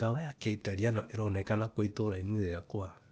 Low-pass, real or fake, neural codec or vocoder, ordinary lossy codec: none; fake; codec, 16 kHz, 0.8 kbps, ZipCodec; none